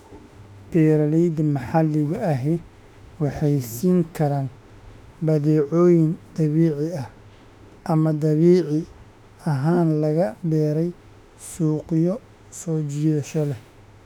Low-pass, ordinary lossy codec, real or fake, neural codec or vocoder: 19.8 kHz; none; fake; autoencoder, 48 kHz, 32 numbers a frame, DAC-VAE, trained on Japanese speech